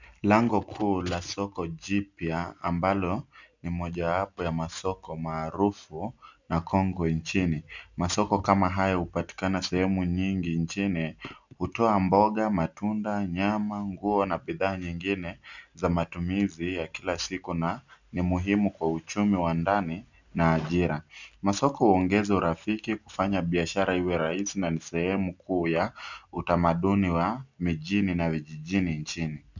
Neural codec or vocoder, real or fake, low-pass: none; real; 7.2 kHz